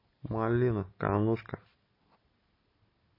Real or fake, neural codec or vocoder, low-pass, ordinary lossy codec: real; none; 5.4 kHz; MP3, 24 kbps